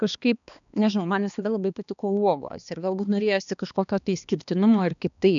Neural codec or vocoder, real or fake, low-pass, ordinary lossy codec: codec, 16 kHz, 2 kbps, X-Codec, HuBERT features, trained on balanced general audio; fake; 7.2 kHz; MP3, 96 kbps